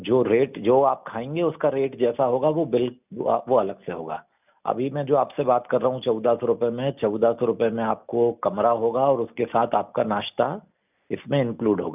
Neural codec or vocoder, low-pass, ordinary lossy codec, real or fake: none; 3.6 kHz; none; real